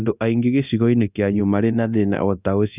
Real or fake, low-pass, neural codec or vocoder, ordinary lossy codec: fake; 3.6 kHz; codec, 24 kHz, 0.9 kbps, DualCodec; none